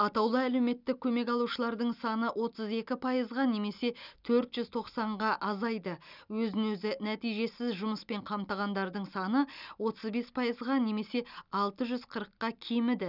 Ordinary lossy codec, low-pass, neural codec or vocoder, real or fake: none; 5.4 kHz; none; real